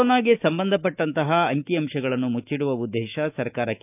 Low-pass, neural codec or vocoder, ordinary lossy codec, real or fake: 3.6 kHz; autoencoder, 48 kHz, 128 numbers a frame, DAC-VAE, trained on Japanese speech; none; fake